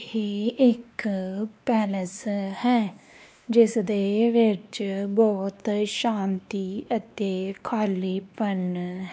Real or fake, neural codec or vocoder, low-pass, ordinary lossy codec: fake; codec, 16 kHz, 2 kbps, X-Codec, WavLM features, trained on Multilingual LibriSpeech; none; none